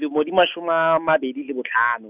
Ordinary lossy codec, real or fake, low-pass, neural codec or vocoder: none; real; 3.6 kHz; none